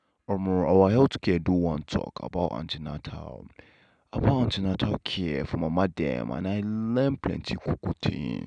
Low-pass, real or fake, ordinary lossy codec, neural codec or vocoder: 9.9 kHz; real; none; none